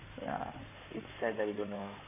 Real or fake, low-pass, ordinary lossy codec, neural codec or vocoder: fake; 3.6 kHz; none; codec, 16 kHz in and 24 kHz out, 2.2 kbps, FireRedTTS-2 codec